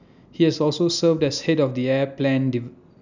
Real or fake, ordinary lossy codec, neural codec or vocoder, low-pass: real; none; none; 7.2 kHz